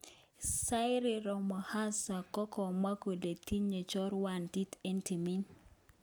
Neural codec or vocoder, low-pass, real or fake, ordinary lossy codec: none; none; real; none